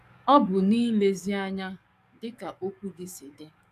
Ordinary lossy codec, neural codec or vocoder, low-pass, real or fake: none; codec, 44.1 kHz, 7.8 kbps, DAC; 14.4 kHz; fake